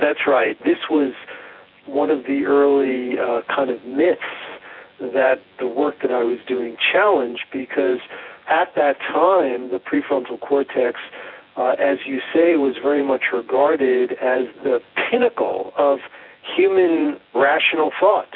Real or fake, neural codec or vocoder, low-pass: fake; vocoder, 24 kHz, 100 mel bands, Vocos; 5.4 kHz